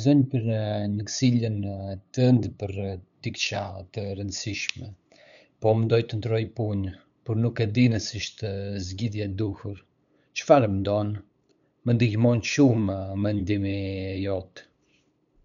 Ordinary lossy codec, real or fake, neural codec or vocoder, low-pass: none; fake; codec, 16 kHz, 8 kbps, FunCodec, trained on LibriTTS, 25 frames a second; 7.2 kHz